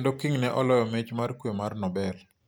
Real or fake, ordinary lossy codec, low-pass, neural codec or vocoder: real; none; none; none